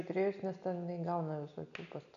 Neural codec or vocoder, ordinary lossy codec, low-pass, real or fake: none; MP3, 96 kbps; 7.2 kHz; real